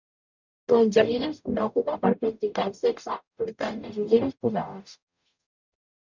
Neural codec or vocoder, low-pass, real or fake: codec, 44.1 kHz, 0.9 kbps, DAC; 7.2 kHz; fake